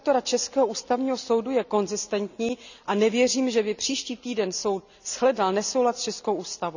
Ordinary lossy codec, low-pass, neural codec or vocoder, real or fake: none; 7.2 kHz; none; real